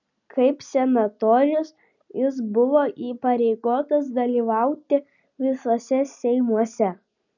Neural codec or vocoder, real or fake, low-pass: none; real; 7.2 kHz